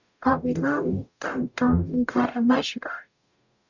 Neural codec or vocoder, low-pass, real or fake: codec, 44.1 kHz, 0.9 kbps, DAC; 7.2 kHz; fake